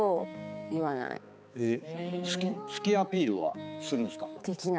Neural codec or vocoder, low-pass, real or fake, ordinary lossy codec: codec, 16 kHz, 4 kbps, X-Codec, HuBERT features, trained on balanced general audio; none; fake; none